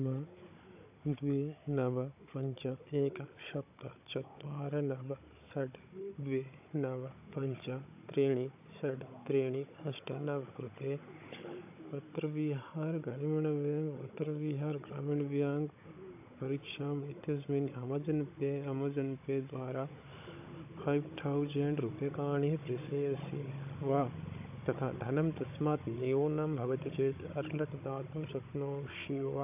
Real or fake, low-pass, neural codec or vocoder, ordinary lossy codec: fake; 3.6 kHz; codec, 16 kHz, 16 kbps, FunCodec, trained on Chinese and English, 50 frames a second; AAC, 32 kbps